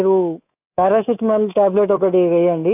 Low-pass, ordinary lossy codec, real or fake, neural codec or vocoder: 3.6 kHz; AAC, 32 kbps; fake; codec, 24 kHz, 3.1 kbps, DualCodec